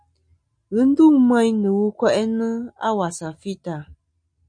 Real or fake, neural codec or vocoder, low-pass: real; none; 9.9 kHz